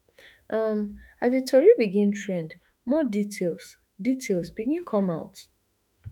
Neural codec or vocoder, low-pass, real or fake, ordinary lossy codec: autoencoder, 48 kHz, 32 numbers a frame, DAC-VAE, trained on Japanese speech; none; fake; none